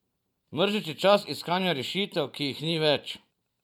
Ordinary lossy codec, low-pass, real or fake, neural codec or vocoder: none; 19.8 kHz; fake; vocoder, 44.1 kHz, 128 mel bands every 512 samples, BigVGAN v2